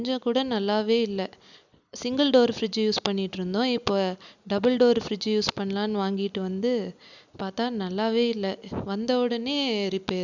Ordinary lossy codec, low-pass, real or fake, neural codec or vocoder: none; 7.2 kHz; real; none